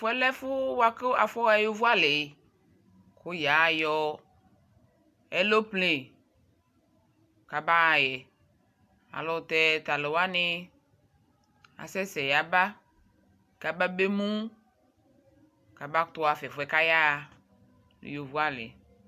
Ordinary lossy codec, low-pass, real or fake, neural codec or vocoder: AAC, 96 kbps; 14.4 kHz; real; none